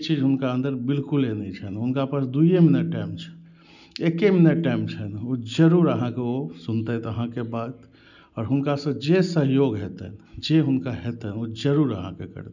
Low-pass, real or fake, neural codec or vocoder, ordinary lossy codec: 7.2 kHz; real; none; none